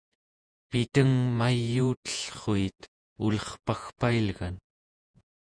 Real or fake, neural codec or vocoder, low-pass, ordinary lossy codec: fake; vocoder, 48 kHz, 128 mel bands, Vocos; 9.9 kHz; Opus, 64 kbps